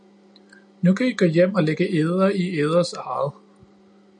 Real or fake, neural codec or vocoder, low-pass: real; none; 9.9 kHz